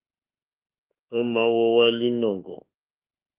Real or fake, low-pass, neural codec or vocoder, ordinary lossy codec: fake; 3.6 kHz; autoencoder, 48 kHz, 32 numbers a frame, DAC-VAE, trained on Japanese speech; Opus, 32 kbps